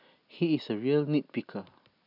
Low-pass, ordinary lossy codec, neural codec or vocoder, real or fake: 5.4 kHz; none; none; real